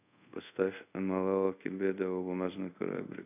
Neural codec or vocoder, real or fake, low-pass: codec, 24 kHz, 0.5 kbps, DualCodec; fake; 3.6 kHz